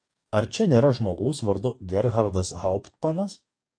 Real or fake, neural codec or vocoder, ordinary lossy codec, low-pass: fake; codec, 44.1 kHz, 2.6 kbps, DAC; AAC, 64 kbps; 9.9 kHz